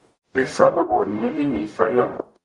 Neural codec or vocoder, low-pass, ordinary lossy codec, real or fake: codec, 44.1 kHz, 0.9 kbps, DAC; 10.8 kHz; Opus, 64 kbps; fake